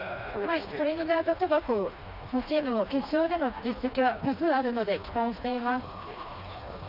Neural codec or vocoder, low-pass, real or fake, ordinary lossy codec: codec, 16 kHz, 2 kbps, FreqCodec, smaller model; 5.4 kHz; fake; none